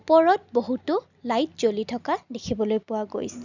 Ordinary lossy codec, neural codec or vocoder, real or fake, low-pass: none; none; real; 7.2 kHz